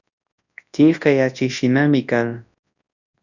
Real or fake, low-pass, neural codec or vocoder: fake; 7.2 kHz; codec, 24 kHz, 0.9 kbps, WavTokenizer, large speech release